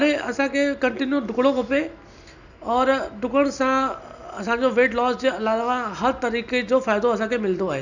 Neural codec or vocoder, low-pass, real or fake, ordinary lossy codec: none; 7.2 kHz; real; none